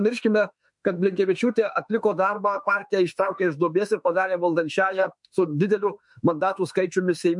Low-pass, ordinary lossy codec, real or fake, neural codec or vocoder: 10.8 kHz; MP3, 64 kbps; fake; autoencoder, 48 kHz, 32 numbers a frame, DAC-VAE, trained on Japanese speech